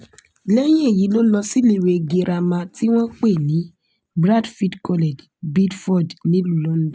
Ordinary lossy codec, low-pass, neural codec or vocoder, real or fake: none; none; none; real